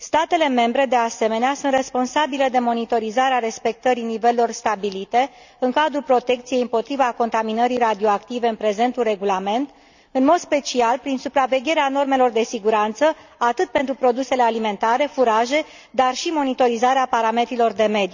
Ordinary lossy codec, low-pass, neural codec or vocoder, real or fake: none; 7.2 kHz; none; real